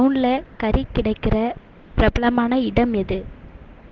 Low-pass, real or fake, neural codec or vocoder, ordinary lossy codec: 7.2 kHz; real; none; Opus, 16 kbps